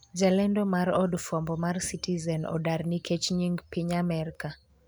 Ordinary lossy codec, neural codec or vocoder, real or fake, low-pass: none; none; real; none